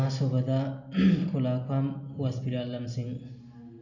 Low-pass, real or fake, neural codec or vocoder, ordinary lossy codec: 7.2 kHz; real; none; none